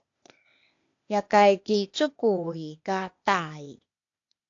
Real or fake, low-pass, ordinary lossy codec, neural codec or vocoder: fake; 7.2 kHz; AAC, 48 kbps; codec, 16 kHz, 0.8 kbps, ZipCodec